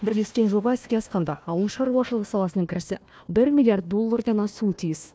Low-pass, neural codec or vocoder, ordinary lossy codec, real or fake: none; codec, 16 kHz, 1 kbps, FunCodec, trained on Chinese and English, 50 frames a second; none; fake